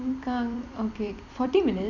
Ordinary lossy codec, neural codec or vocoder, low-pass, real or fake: none; vocoder, 44.1 kHz, 128 mel bands every 256 samples, BigVGAN v2; 7.2 kHz; fake